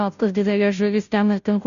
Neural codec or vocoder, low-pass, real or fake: codec, 16 kHz, 0.5 kbps, FunCodec, trained on Chinese and English, 25 frames a second; 7.2 kHz; fake